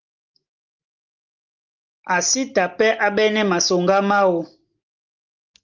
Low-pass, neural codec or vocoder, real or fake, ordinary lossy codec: 7.2 kHz; none; real; Opus, 24 kbps